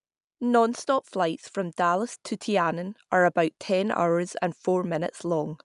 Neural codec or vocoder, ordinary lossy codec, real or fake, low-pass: none; none; real; 10.8 kHz